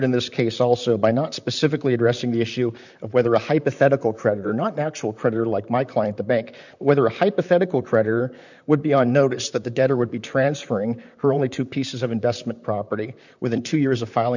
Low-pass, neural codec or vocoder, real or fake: 7.2 kHz; vocoder, 44.1 kHz, 128 mel bands, Pupu-Vocoder; fake